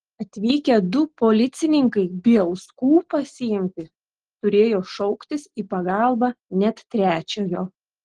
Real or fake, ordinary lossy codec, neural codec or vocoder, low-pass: real; Opus, 16 kbps; none; 9.9 kHz